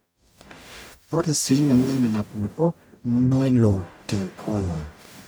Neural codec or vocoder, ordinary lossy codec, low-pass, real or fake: codec, 44.1 kHz, 0.9 kbps, DAC; none; none; fake